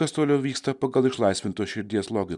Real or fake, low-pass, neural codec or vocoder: real; 10.8 kHz; none